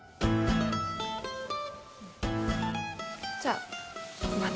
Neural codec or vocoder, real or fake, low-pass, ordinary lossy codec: none; real; none; none